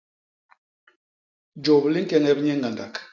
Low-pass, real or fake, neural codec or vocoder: 7.2 kHz; real; none